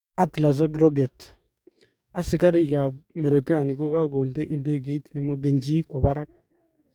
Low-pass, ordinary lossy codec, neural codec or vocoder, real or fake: 19.8 kHz; none; codec, 44.1 kHz, 2.6 kbps, DAC; fake